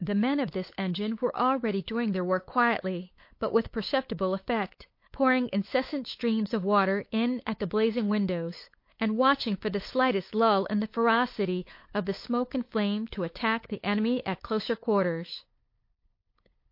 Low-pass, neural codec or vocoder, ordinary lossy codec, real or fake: 5.4 kHz; codec, 16 kHz, 8 kbps, FunCodec, trained on LibriTTS, 25 frames a second; MP3, 32 kbps; fake